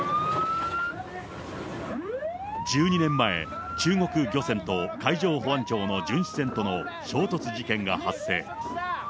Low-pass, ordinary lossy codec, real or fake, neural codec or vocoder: none; none; real; none